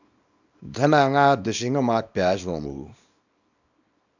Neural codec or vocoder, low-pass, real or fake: codec, 24 kHz, 0.9 kbps, WavTokenizer, small release; 7.2 kHz; fake